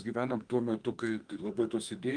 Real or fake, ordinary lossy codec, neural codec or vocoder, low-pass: fake; Opus, 32 kbps; autoencoder, 48 kHz, 32 numbers a frame, DAC-VAE, trained on Japanese speech; 9.9 kHz